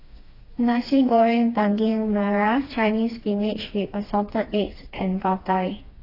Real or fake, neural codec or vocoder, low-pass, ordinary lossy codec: fake; codec, 16 kHz, 2 kbps, FreqCodec, smaller model; 5.4 kHz; AAC, 24 kbps